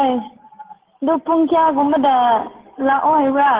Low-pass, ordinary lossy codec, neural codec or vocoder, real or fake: 3.6 kHz; Opus, 16 kbps; none; real